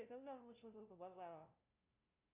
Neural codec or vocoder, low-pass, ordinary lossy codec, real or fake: codec, 16 kHz, 0.5 kbps, FunCodec, trained on LibriTTS, 25 frames a second; 3.6 kHz; Opus, 64 kbps; fake